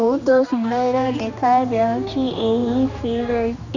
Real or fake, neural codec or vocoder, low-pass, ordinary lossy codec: fake; codec, 16 kHz, 2 kbps, X-Codec, HuBERT features, trained on general audio; 7.2 kHz; none